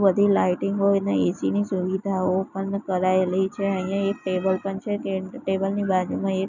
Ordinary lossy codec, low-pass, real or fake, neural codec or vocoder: none; 7.2 kHz; real; none